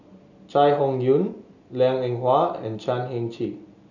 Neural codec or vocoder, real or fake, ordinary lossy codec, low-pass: none; real; none; 7.2 kHz